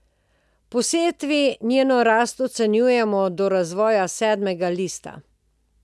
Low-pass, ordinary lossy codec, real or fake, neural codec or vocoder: none; none; real; none